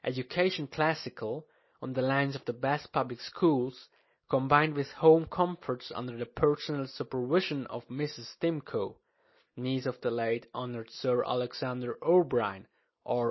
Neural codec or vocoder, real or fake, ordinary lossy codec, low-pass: none; real; MP3, 24 kbps; 7.2 kHz